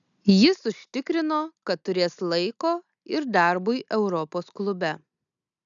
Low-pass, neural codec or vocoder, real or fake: 7.2 kHz; none; real